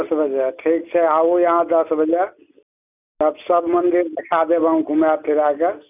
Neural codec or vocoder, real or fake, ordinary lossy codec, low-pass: none; real; none; 3.6 kHz